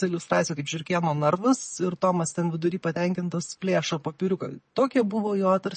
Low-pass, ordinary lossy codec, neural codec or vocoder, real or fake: 9.9 kHz; MP3, 32 kbps; none; real